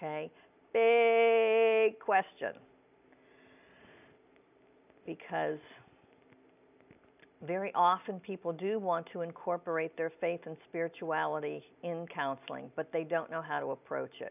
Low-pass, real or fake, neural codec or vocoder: 3.6 kHz; real; none